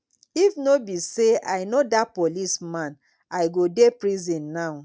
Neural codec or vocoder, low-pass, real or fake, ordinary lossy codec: none; none; real; none